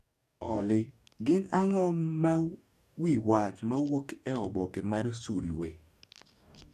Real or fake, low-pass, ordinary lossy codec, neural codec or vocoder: fake; 14.4 kHz; none; codec, 44.1 kHz, 2.6 kbps, DAC